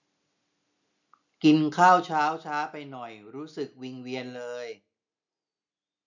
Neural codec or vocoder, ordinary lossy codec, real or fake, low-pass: none; none; real; 7.2 kHz